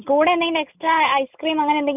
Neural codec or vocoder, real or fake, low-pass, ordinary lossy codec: none; real; 3.6 kHz; none